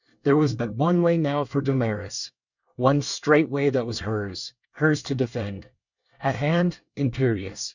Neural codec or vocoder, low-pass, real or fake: codec, 24 kHz, 1 kbps, SNAC; 7.2 kHz; fake